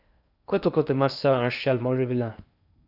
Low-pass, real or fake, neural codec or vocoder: 5.4 kHz; fake; codec, 16 kHz in and 24 kHz out, 0.8 kbps, FocalCodec, streaming, 65536 codes